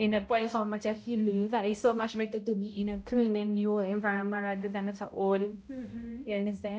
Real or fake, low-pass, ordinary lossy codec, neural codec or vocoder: fake; none; none; codec, 16 kHz, 0.5 kbps, X-Codec, HuBERT features, trained on balanced general audio